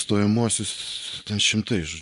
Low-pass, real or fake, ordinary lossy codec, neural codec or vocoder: 10.8 kHz; fake; AAC, 96 kbps; vocoder, 24 kHz, 100 mel bands, Vocos